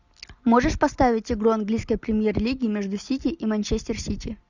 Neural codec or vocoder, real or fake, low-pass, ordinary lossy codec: none; real; 7.2 kHz; Opus, 64 kbps